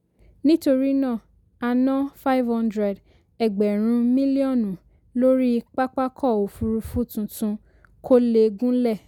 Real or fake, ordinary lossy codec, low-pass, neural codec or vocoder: real; none; 19.8 kHz; none